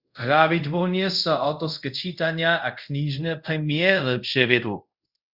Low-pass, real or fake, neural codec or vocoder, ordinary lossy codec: 5.4 kHz; fake; codec, 24 kHz, 0.5 kbps, DualCodec; Opus, 64 kbps